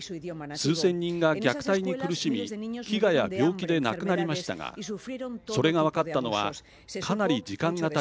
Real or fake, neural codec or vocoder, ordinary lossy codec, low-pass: real; none; none; none